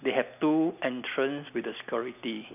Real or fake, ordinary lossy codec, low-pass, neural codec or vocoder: real; none; 3.6 kHz; none